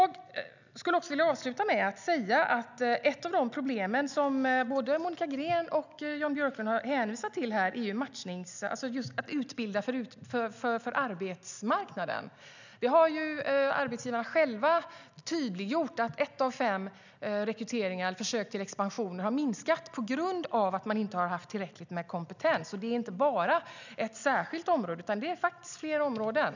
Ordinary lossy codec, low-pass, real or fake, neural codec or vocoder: none; 7.2 kHz; real; none